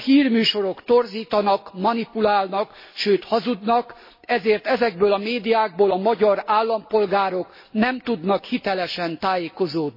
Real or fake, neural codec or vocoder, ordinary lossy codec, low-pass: real; none; MP3, 24 kbps; 5.4 kHz